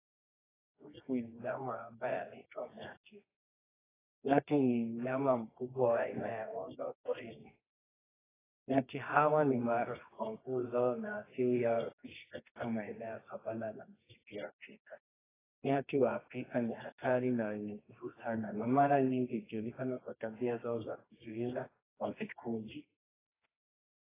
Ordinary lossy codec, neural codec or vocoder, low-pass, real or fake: AAC, 16 kbps; codec, 24 kHz, 0.9 kbps, WavTokenizer, medium music audio release; 3.6 kHz; fake